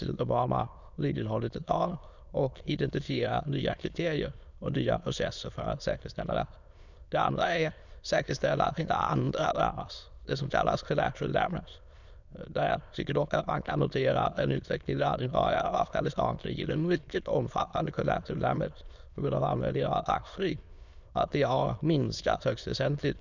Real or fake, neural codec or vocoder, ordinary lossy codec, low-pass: fake; autoencoder, 22.05 kHz, a latent of 192 numbers a frame, VITS, trained on many speakers; none; 7.2 kHz